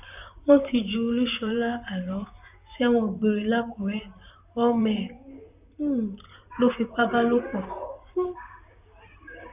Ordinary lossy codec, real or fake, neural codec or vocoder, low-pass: none; fake; vocoder, 44.1 kHz, 128 mel bands, Pupu-Vocoder; 3.6 kHz